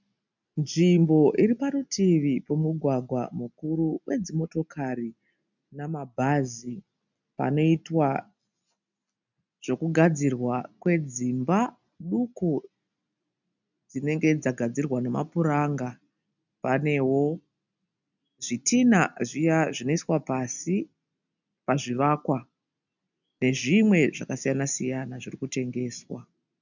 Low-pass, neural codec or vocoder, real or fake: 7.2 kHz; none; real